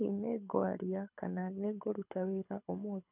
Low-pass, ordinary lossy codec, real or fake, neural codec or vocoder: 3.6 kHz; none; fake; codec, 24 kHz, 6 kbps, HILCodec